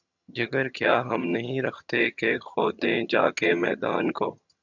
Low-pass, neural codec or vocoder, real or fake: 7.2 kHz; vocoder, 22.05 kHz, 80 mel bands, HiFi-GAN; fake